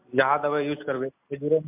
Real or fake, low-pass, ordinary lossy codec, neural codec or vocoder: real; 3.6 kHz; MP3, 32 kbps; none